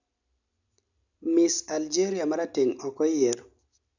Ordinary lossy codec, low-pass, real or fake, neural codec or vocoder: none; 7.2 kHz; real; none